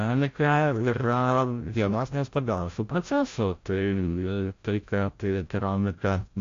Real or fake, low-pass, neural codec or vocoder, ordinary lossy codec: fake; 7.2 kHz; codec, 16 kHz, 0.5 kbps, FreqCodec, larger model; AAC, 48 kbps